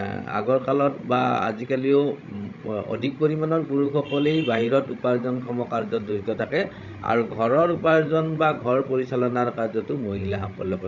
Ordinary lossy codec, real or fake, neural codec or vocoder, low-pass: none; fake; codec, 16 kHz, 16 kbps, FreqCodec, larger model; 7.2 kHz